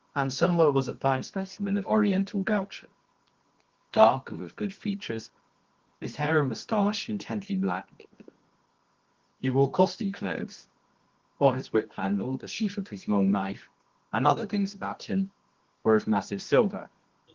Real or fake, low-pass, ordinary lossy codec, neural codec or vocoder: fake; 7.2 kHz; Opus, 16 kbps; codec, 24 kHz, 0.9 kbps, WavTokenizer, medium music audio release